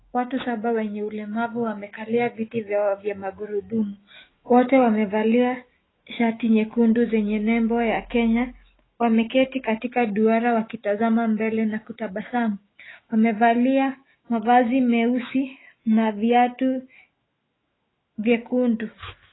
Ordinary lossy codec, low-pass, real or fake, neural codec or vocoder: AAC, 16 kbps; 7.2 kHz; fake; autoencoder, 48 kHz, 128 numbers a frame, DAC-VAE, trained on Japanese speech